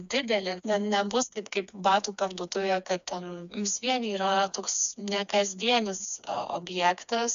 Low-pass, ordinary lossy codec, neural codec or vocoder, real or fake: 7.2 kHz; AAC, 96 kbps; codec, 16 kHz, 2 kbps, FreqCodec, smaller model; fake